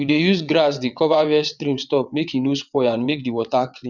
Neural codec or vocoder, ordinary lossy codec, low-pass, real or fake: vocoder, 22.05 kHz, 80 mel bands, WaveNeXt; none; 7.2 kHz; fake